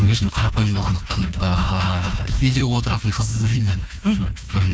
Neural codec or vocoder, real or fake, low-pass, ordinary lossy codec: codec, 16 kHz, 1 kbps, FunCodec, trained on Chinese and English, 50 frames a second; fake; none; none